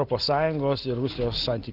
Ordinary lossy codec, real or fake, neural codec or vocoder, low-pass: Opus, 16 kbps; real; none; 5.4 kHz